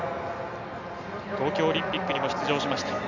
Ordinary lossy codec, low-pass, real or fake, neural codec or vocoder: none; 7.2 kHz; real; none